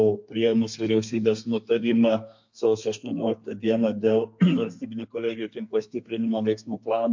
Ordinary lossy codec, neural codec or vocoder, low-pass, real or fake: MP3, 48 kbps; codec, 32 kHz, 1.9 kbps, SNAC; 7.2 kHz; fake